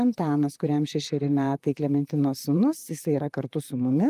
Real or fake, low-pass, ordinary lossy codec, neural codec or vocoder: fake; 14.4 kHz; Opus, 32 kbps; vocoder, 44.1 kHz, 128 mel bands, Pupu-Vocoder